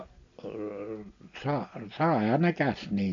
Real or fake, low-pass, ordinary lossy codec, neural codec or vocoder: real; 7.2 kHz; MP3, 64 kbps; none